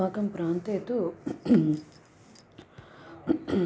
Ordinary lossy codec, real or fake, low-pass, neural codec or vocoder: none; real; none; none